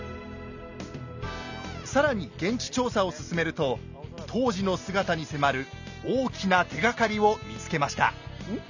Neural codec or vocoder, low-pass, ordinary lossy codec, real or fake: none; 7.2 kHz; none; real